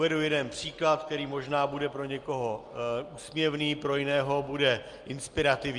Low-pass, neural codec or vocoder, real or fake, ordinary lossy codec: 10.8 kHz; none; real; Opus, 32 kbps